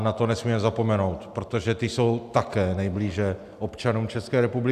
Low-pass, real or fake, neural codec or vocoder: 14.4 kHz; real; none